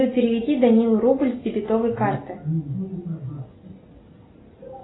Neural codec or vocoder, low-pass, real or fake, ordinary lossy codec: none; 7.2 kHz; real; AAC, 16 kbps